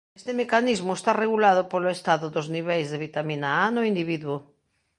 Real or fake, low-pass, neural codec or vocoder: real; 10.8 kHz; none